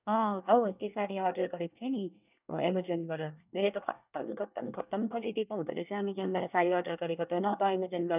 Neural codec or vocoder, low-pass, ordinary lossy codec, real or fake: codec, 24 kHz, 1 kbps, SNAC; 3.6 kHz; none; fake